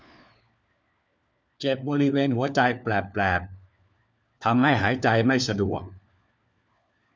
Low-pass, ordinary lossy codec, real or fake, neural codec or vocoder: none; none; fake; codec, 16 kHz, 4 kbps, FunCodec, trained on LibriTTS, 50 frames a second